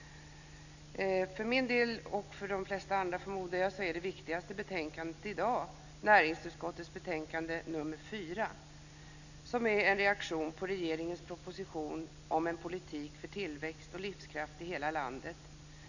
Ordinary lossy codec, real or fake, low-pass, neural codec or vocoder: none; real; 7.2 kHz; none